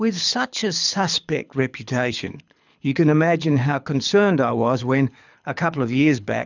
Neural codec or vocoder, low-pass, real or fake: codec, 24 kHz, 6 kbps, HILCodec; 7.2 kHz; fake